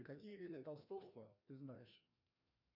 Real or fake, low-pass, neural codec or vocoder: fake; 5.4 kHz; codec, 16 kHz, 1 kbps, FreqCodec, larger model